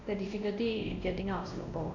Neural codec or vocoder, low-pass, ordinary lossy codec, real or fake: codec, 16 kHz, 1 kbps, X-Codec, WavLM features, trained on Multilingual LibriSpeech; 7.2 kHz; MP3, 64 kbps; fake